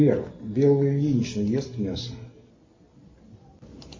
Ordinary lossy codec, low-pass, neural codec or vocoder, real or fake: MP3, 32 kbps; 7.2 kHz; none; real